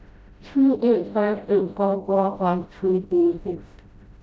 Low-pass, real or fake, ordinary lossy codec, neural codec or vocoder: none; fake; none; codec, 16 kHz, 0.5 kbps, FreqCodec, smaller model